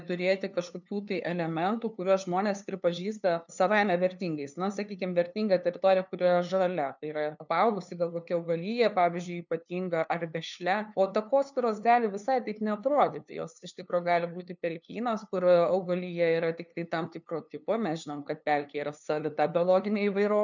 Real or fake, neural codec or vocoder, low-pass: fake; codec, 16 kHz, 2 kbps, FunCodec, trained on LibriTTS, 25 frames a second; 7.2 kHz